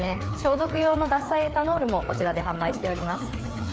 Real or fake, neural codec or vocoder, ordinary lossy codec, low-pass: fake; codec, 16 kHz, 4 kbps, FreqCodec, larger model; none; none